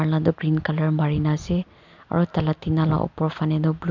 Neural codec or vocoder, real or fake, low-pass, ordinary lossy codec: none; real; 7.2 kHz; MP3, 64 kbps